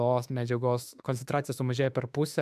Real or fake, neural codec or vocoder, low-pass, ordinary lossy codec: fake; autoencoder, 48 kHz, 32 numbers a frame, DAC-VAE, trained on Japanese speech; 14.4 kHz; AAC, 96 kbps